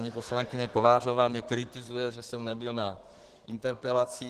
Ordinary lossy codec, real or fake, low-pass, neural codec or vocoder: Opus, 16 kbps; fake; 14.4 kHz; codec, 32 kHz, 1.9 kbps, SNAC